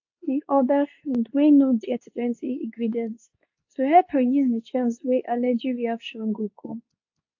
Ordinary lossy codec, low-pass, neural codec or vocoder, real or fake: AAC, 48 kbps; 7.2 kHz; codec, 24 kHz, 0.9 kbps, WavTokenizer, medium speech release version 2; fake